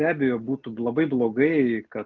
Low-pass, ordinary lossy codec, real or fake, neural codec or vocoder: 7.2 kHz; Opus, 32 kbps; real; none